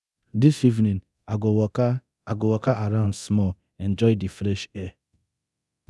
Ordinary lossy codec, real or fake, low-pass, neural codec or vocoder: none; fake; none; codec, 24 kHz, 0.9 kbps, DualCodec